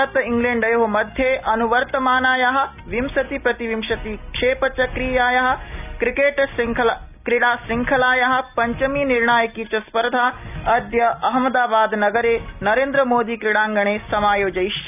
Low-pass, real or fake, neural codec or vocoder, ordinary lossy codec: 3.6 kHz; real; none; none